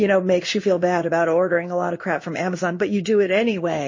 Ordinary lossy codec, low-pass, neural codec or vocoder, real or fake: MP3, 32 kbps; 7.2 kHz; codec, 16 kHz in and 24 kHz out, 1 kbps, XY-Tokenizer; fake